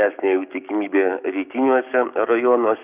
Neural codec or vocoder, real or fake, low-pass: codec, 16 kHz, 6 kbps, DAC; fake; 3.6 kHz